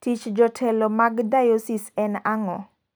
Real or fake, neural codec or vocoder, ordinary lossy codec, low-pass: real; none; none; none